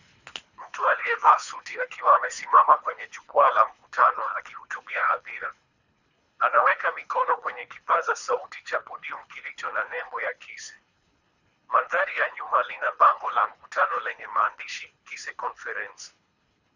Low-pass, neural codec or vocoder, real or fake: 7.2 kHz; codec, 24 kHz, 3 kbps, HILCodec; fake